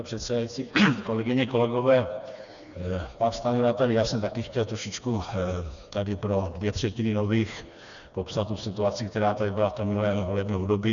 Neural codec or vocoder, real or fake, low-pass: codec, 16 kHz, 2 kbps, FreqCodec, smaller model; fake; 7.2 kHz